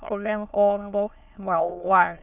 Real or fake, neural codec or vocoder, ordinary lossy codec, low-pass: fake; autoencoder, 22.05 kHz, a latent of 192 numbers a frame, VITS, trained on many speakers; none; 3.6 kHz